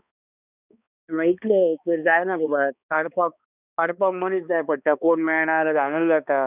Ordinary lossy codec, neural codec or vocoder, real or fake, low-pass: none; codec, 16 kHz, 2 kbps, X-Codec, HuBERT features, trained on balanced general audio; fake; 3.6 kHz